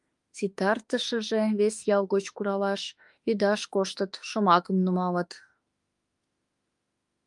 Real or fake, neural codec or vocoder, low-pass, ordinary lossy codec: fake; autoencoder, 48 kHz, 32 numbers a frame, DAC-VAE, trained on Japanese speech; 10.8 kHz; Opus, 32 kbps